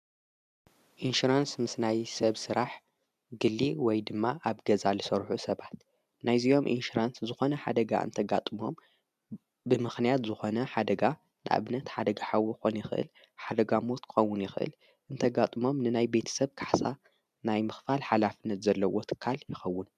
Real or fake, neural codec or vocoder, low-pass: real; none; 14.4 kHz